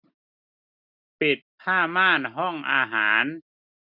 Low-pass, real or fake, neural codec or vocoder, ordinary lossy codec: 5.4 kHz; real; none; none